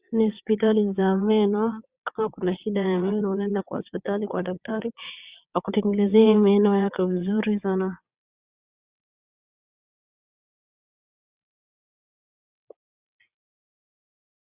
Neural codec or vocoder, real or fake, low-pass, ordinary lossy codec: vocoder, 22.05 kHz, 80 mel bands, WaveNeXt; fake; 3.6 kHz; Opus, 64 kbps